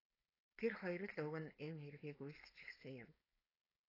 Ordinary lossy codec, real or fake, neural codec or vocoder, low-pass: AAC, 24 kbps; fake; codec, 16 kHz, 4.8 kbps, FACodec; 5.4 kHz